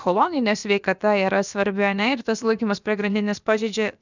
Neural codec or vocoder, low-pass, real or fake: codec, 16 kHz, about 1 kbps, DyCAST, with the encoder's durations; 7.2 kHz; fake